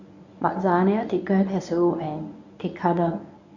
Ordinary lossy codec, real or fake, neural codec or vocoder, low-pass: none; fake; codec, 24 kHz, 0.9 kbps, WavTokenizer, medium speech release version 1; 7.2 kHz